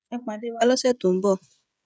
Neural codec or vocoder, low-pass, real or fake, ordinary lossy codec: codec, 16 kHz, 16 kbps, FreqCodec, smaller model; none; fake; none